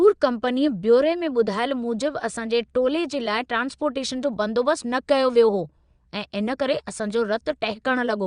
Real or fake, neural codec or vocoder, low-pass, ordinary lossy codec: fake; vocoder, 22.05 kHz, 80 mel bands, Vocos; 9.9 kHz; Opus, 64 kbps